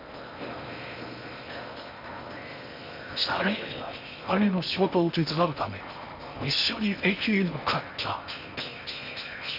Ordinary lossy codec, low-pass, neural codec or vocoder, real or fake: none; 5.4 kHz; codec, 16 kHz in and 24 kHz out, 0.6 kbps, FocalCodec, streaming, 4096 codes; fake